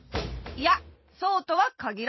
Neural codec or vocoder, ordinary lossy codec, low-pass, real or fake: vocoder, 44.1 kHz, 128 mel bands every 512 samples, BigVGAN v2; MP3, 24 kbps; 7.2 kHz; fake